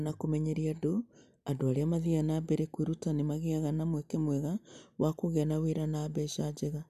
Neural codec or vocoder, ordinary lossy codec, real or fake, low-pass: none; none; real; 14.4 kHz